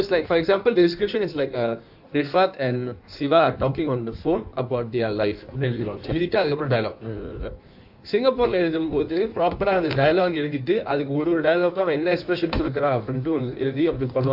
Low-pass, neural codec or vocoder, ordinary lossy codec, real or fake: 5.4 kHz; codec, 16 kHz in and 24 kHz out, 1.1 kbps, FireRedTTS-2 codec; AAC, 48 kbps; fake